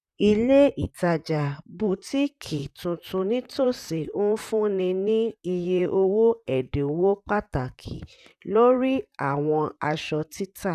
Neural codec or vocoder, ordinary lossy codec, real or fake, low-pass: vocoder, 44.1 kHz, 128 mel bands, Pupu-Vocoder; none; fake; 14.4 kHz